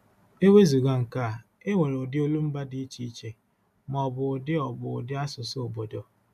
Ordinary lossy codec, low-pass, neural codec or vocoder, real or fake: MP3, 96 kbps; 14.4 kHz; none; real